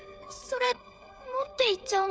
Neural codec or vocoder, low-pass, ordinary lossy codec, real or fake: codec, 16 kHz, 8 kbps, FreqCodec, smaller model; none; none; fake